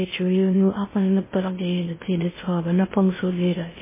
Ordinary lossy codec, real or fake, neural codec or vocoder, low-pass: MP3, 16 kbps; fake; codec, 16 kHz in and 24 kHz out, 0.6 kbps, FocalCodec, streaming, 2048 codes; 3.6 kHz